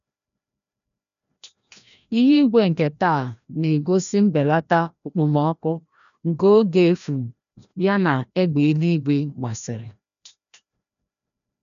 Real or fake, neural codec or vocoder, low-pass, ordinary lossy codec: fake; codec, 16 kHz, 1 kbps, FreqCodec, larger model; 7.2 kHz; none